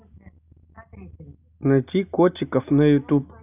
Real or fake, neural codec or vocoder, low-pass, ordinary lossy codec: real; none; 3.6 kHz; none